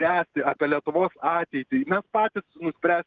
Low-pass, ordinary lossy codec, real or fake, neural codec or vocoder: 7.2 kHz; Opus, 24 kbps; real; none